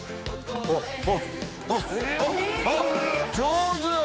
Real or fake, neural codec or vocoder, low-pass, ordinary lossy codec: fake; codec, 16 kHz, 4 kbps, X-Codec, HuBERT features, trained on general audio; none; none